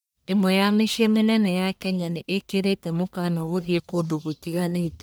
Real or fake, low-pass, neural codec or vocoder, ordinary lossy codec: fake; none; codec, 44.1 kHz, 1.7 kbps, Pupu-Codec; none